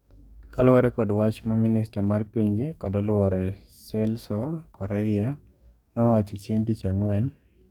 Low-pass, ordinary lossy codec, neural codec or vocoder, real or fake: 19.8 kHz; none; codec, 44.1 kHz, 2.6 kbps, DAC; fake